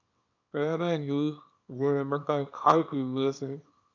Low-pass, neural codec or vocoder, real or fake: 7.2 kHz; codec, 24 kHz, 0.9 kbps, WavTokenizer, small release; fake